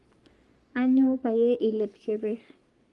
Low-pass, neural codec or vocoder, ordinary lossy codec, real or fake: 10.8 kHz; codec, 44.1 kHz, 3.4 kbps, Pupu-Codec; Opus, 32 kbps; fake